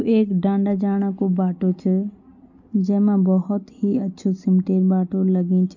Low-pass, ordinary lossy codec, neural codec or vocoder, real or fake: 7.2 kHz; none; none; real